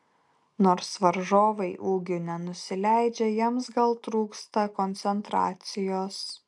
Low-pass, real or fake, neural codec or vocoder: 10.8 kHz; real; none